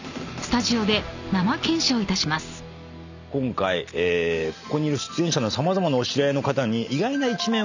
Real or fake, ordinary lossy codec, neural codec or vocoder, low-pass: real; none; none; 7.2 kHz